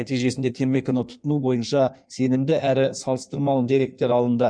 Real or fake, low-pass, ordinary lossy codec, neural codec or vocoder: fake; 9.9 kHz; none; codec, 16 kHz in and 24 kHz out, 1.1 kbps, FireRedTTS-2 codec